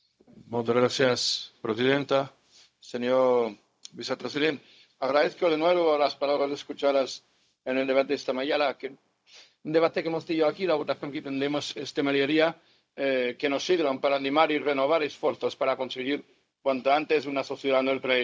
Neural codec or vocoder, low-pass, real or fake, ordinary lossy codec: codec, 16 kHz, 0.4 kbps, LongCat-Audio-Codec; none; fake; none